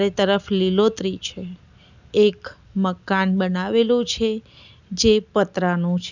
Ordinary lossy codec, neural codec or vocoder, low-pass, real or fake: none; none; 7.2 kHz; real